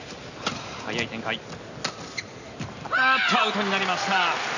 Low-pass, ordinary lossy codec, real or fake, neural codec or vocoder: 7.2 kHz; none; real; none